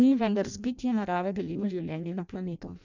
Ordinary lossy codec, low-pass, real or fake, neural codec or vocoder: none; 7.2 kHz; fake; codec, 16 kHz in and 24 kHz out, 0.6 kbps, FireRedTTS-2 codec